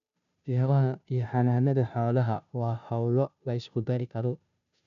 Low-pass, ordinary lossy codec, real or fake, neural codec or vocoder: 7.2 kHz; none; fake; codec, 16 kHz, 0.5 kbps, FunCodec, trained on Chinese and English, 25 frames a second